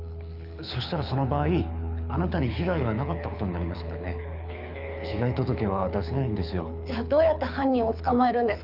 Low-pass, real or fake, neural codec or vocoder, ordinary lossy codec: 5.4 kHz; fake; codec, 24 kHz, 6 kbps, HILCodec; none